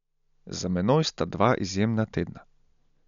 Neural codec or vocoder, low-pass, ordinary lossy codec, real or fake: none; 7.2 kHz; none; real